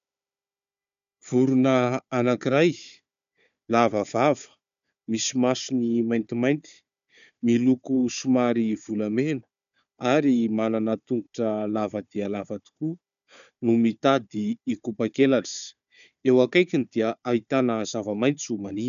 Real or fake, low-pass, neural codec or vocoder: fake; 7.2 kHz; codec, 16 kHz, 4 kbps, FunCodec, trained on Chinese and English, 50 frames a second